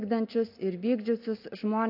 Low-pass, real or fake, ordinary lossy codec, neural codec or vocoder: 5.4 kHz; real; AAC, 32 kbps; none